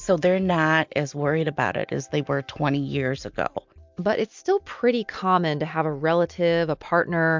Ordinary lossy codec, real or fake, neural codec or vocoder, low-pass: MP3, 64 kbps; real; none; 7.2 kHz